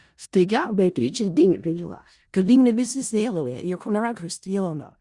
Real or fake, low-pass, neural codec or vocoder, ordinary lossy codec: fake; 10.8 kHz; codec, 16 kHz in and 24 kHz out, 0.4 kbps, LongCat-Audio-Codec, four codebook decoder; Opus, 64 kbps